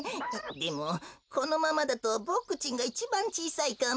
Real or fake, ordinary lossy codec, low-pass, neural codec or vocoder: real; none; none; none